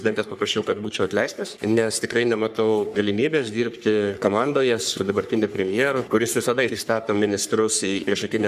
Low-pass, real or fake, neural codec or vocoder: 14.4 kHz; fake; codec, 44.1 kHz, 3.4 kbps, Pupu-Codec